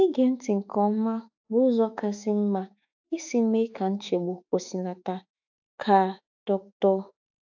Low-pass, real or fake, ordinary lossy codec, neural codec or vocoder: 7.2 kHz; fake; AAC, 48 kbps; autoencoder, 48 kHz, 32 numbers a frame, DAC-VAE, trained on Japanese speech